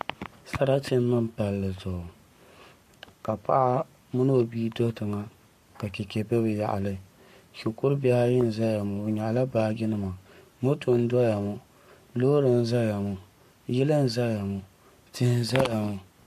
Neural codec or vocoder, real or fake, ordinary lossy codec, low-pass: codec, 44.1 kHz, 7.8 kbps, Pupu-Codec; fake; MP3, 64 kbps; 14.4 kHz